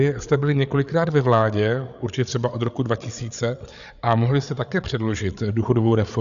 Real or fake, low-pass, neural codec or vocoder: fake; 7.2 kHz; codec, 16 kHz, 8 kbps, FreqCodec, larger model